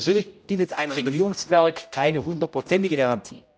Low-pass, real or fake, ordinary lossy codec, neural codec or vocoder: none; fake; none; codec, 16 kHz, 0.5 kbps, X-Codec, HuBERT features, trained on general audio